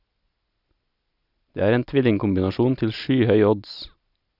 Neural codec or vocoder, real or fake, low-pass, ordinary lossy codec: none; real; 5.4 kHz; none